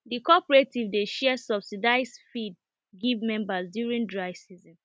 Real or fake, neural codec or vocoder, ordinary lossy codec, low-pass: real; none; none; none